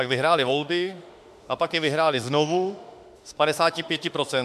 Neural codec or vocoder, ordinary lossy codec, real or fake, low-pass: autoencoder, 48 kHz, 32 numbers a frame, DAC-VAE, trained on Japanese speech; MP3, 96 kbps; fake; 14.4 kHz